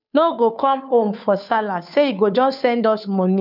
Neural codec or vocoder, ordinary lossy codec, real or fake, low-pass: codec, 16 kHz, 2 kbps, FunCodec, trained on Chinese and English, 25 frames a second; none; fake; 5.4 kHz